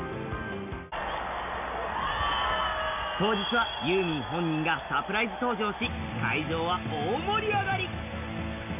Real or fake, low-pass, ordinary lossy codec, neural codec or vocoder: real; 3.6 kHz; none; none